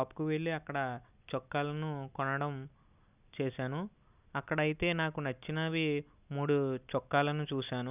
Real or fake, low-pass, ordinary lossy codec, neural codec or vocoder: real; 3.6 kHz; none; none